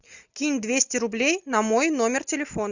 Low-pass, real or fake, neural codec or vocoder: 7.2 kHz; real; none